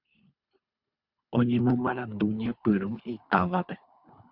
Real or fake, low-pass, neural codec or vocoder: fake; 5.4 kHz; codec, 24 kHz, 3 kbps, HILCodec